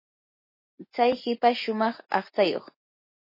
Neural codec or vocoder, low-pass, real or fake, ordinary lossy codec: none; 5.4 kHz; real; MP3, 24 kbps